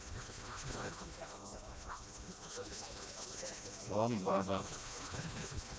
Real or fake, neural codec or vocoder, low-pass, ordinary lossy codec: fake; codec, 16 kHz, 1 kbps, FreqCodec, smaller model; none; none